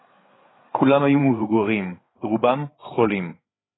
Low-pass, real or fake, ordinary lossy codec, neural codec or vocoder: 7.2 kHz; fake; AAC, 16 kbps; codec, 16 kHz, 8 kbps, FreqCodec, larger model